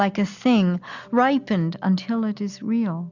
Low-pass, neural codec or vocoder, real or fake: 7.2 kHz; none; real